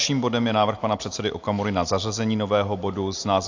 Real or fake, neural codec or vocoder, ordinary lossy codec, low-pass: real; none; AAC, 48 kbps; 7.2 kHz